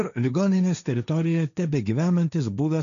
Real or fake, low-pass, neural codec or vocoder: fake; 7.2 kHz; codec, 16 kHz, 1.1 kbps, Voila-Tokenizer